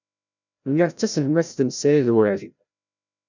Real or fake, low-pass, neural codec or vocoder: fake; 7.2 kHz; codec, 16 kHz, 0.5 kbps, FreqCodec, larger model